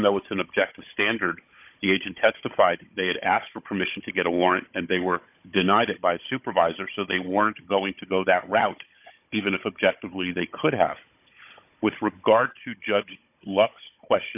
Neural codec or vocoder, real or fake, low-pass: codec, 16 kHz, 16 kbps, FunCodec, trained on Chinese and English, 50 frames a second; fake; 3.6 kHz